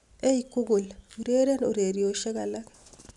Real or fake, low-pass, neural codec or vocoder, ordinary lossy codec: real; 10.8 kHz; none; none